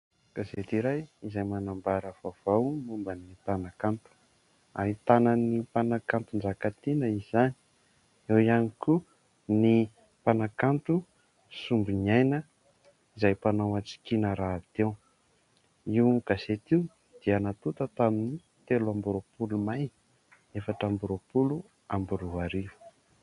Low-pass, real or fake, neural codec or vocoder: 10.8 kHz; real; none